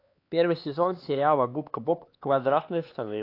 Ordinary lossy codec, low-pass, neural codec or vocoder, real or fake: AAC, 32 kbps; 5.4 kHz; codec, 16 kHz, 4 kbps, X-Codec, HuBERT features, trained on LibriSpeech; fake